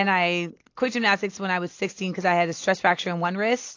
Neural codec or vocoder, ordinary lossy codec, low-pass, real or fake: none; AAC, 48 kbps; 7.2 kHz; real